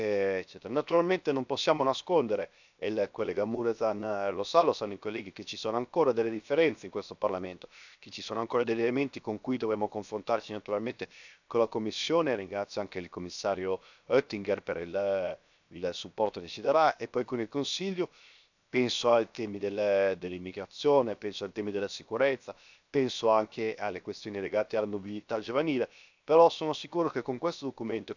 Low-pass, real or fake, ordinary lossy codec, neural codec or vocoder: 7.2 kHz; fake; none; codec, 16 kHz, 0.7 kbps, FocalCodec